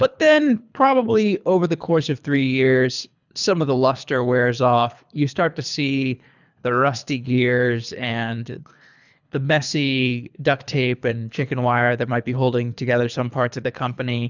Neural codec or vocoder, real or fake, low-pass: codec, 24 kHz, 3 kbps, HILCodec; fake; 7.2 kHz